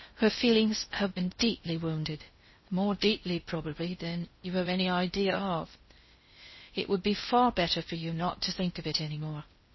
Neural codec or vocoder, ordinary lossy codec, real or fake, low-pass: codec, 16 kHz in and 24 kHz out, 0.6 kbps, FocalCodec, streaming, 4096 codes; MP3, 24 kbps; fake; 7.2 kHz